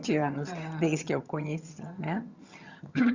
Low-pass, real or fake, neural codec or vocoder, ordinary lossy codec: 7.2 kHz; fake; vocoder, 22.05 kHz, 80 mel bands, HiFi-GAN; Opus, 64 kbps